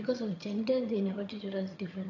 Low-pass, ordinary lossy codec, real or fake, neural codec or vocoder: 7.2 kHz; none; fake; vocoder, 22.05 kHz, 80 mel bands, HiFi-GAN